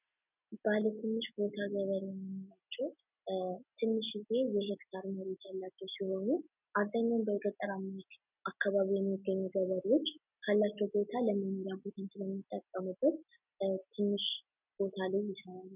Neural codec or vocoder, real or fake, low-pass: none; real; 3.6 kHz